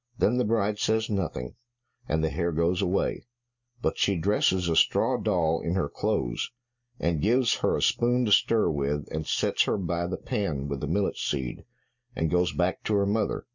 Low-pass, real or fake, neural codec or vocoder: 7.2 kHz; real; none